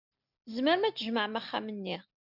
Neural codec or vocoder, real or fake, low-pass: none; real; 5.4 kHz